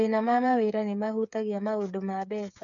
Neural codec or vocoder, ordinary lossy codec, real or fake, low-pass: codec, 16 kHz, 8 kbps, FreqCodec, smaller model; none; fake; 7.2 kHz